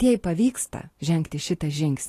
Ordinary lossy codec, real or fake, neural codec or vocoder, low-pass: AAC, 48 kbps; real; none; 14.4 kHz